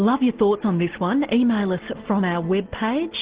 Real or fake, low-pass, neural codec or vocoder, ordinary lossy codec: real; 3.6 kHz; none; Opus, 16 kbps